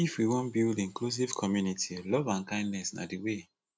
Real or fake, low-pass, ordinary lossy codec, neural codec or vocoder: real; none; none; none